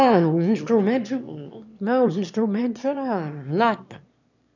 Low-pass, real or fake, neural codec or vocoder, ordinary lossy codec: 7.2 kHz; fake; autoencoder, 22.05 kHz, a latent of 192 numbers a frame, VITS, trained on one speaker; none